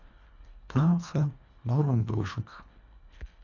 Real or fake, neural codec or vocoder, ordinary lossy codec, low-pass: fake; codec, 24 kHz, 1.5 kbps, HILCodec; none; 7.2 kHz